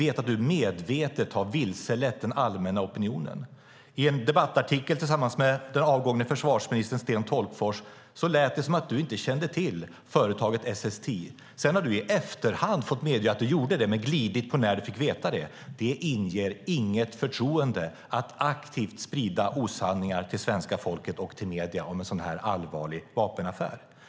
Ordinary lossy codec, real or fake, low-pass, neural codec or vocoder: none; real; none; none